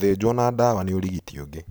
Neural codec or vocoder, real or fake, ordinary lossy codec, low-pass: none; real; none; none